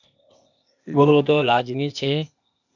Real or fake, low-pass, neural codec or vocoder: fake; 7.2 kHz; codec, 16 kHz, 0.8 kbps, ZipCodec